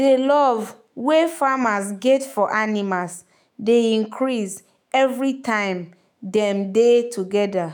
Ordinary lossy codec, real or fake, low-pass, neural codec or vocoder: none; fake; none; autoencoder, 48 kHz, 128 numbers a frame, DAC-VAE, trained on Japanese speech